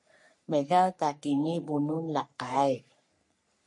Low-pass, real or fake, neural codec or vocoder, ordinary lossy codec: 10.8 kHz; fake; codec, 44.1 kHz, 3.4 kbps, Pupu-Codec; MP3, 48 kbps